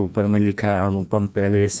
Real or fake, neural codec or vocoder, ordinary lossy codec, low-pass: fake; codec, 16 kHz, 1 kbps, FreqCodec, larger model; none; none